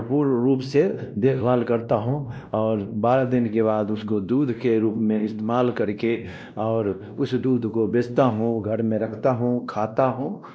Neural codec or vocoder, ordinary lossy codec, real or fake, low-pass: codec, 16 kHz, 1 kbps, X-Codec, WavLM features, trained on Multilingual LibriSpeech; none; fake; none